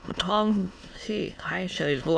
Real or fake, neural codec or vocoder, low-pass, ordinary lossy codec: fake; autoencoder, 22.05 kHz, a latent of 192 numbers a frame, VITS, trained on many speakers; none; none